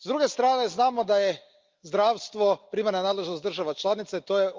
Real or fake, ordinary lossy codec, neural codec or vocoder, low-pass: real; Opus, 32 kbps; none; 7.2 kHz